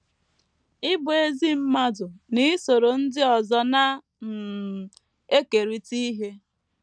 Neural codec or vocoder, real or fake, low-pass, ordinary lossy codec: none; real; 9.9 kHz; none